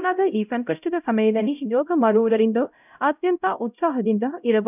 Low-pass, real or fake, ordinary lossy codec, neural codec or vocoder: 3.6 kHz; fake; none; codec, 16 kHz, 0.5 kbps, X-Codec, HuBERT features, trained on LibriSpeech